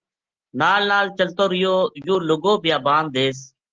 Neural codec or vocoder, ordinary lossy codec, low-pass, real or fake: none; Opus, 16 kbps; 7.2 kHz; real